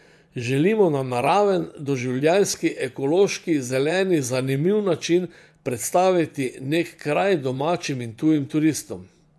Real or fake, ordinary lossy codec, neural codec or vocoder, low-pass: fake; none; vocoder, 24 kHz, 100 mel bands, Vocos; none